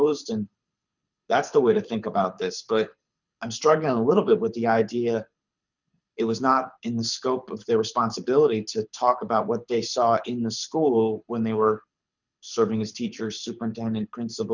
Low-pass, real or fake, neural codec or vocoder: 7.2 kHz; fake; codec, 24 kHz, 6 kbps, HILCodec